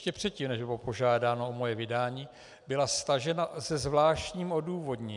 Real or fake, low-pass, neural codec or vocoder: real; 10.8 kHz; none